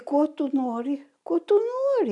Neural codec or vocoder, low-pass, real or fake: none; 10.8 kHz; real